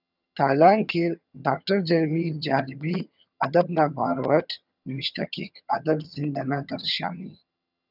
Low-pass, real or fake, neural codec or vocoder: 5.4 kHz; fake; vocoder, 22.05 kHz, 80 mel bands, HiFi-GAN